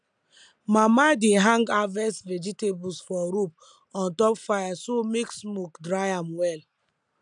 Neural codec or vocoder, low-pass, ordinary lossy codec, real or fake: none; 9.9 kHz; none; real